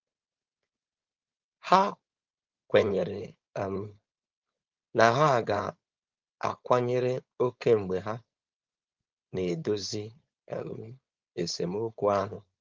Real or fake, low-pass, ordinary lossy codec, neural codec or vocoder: fake; 7.2 kHz; Opus, 24 kbps; codec, 16 kHz, 4.8 kbps, FACodec